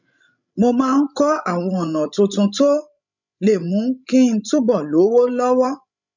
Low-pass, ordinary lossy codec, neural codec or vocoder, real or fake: 7.2 kHz; none; codec, 16 kHz, 16 kbps, FreqCodec, larger model; fake